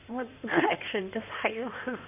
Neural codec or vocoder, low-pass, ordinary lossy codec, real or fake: codec, 16 kHz in and 24 kHz out, 2.2 kbps, FireRedTTS-2 codec; 3.6 kHz; none; fake